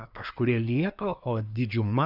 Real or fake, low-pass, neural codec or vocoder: fake; 5.4 kHz; codec, 24 kHz, 1 kbps, SNAC